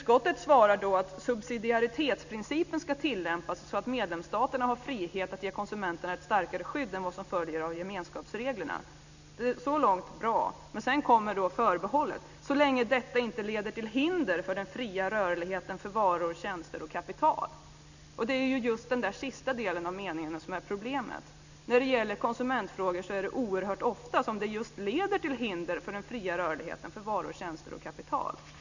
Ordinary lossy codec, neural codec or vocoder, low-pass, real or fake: none; none; 7.2 kHz; real